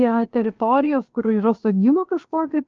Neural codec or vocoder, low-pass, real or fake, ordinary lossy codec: codec, 16 kHz, about 1 kbps, DyCAST, with the encoder's durations; 7.2 kHz; fake; Opus, 24 kbps